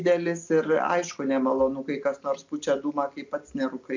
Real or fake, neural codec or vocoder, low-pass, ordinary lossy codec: real; none; 7.2 kHz; MP3, 64 kbps